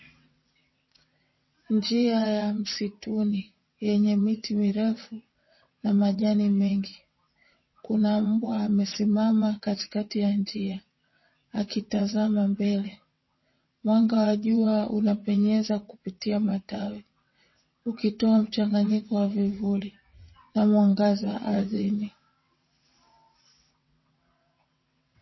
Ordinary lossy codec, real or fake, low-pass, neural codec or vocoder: MP3, 24 kbps; fake; 7.2 kHz; vocoder, 22.05 kHz, 80 mel bands, WaveNeXt